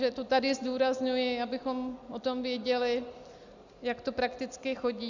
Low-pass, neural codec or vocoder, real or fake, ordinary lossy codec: 7.2 kHz; none; real; Opus, 64 kbps